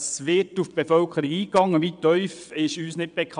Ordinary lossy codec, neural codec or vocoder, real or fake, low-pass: none; vocoder, 22.05 kHz, 80 mel bands, WaveNeXt; fake; 9.9 kHz